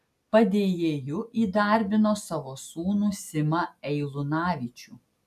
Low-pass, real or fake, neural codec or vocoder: 14.4 kHz; real; none